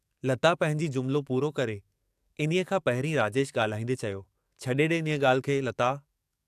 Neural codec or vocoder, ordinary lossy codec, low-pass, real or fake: codec, 44.1 kHz, 7.8 kbps, DAC; AAC, 96 kbps; 14.4 kHz; fake